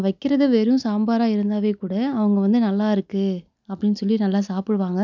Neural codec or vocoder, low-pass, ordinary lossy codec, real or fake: none; 7.2 kHz; none; real